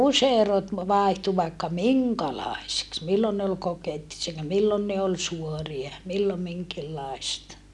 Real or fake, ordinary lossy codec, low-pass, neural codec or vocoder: real; none; none; none